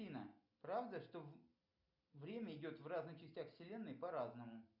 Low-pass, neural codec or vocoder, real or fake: 5.4 kHz; none; real